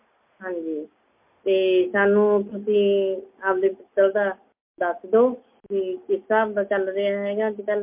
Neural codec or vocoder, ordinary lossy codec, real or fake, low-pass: none; MP3, 32 kbps; real; 3.6 kHz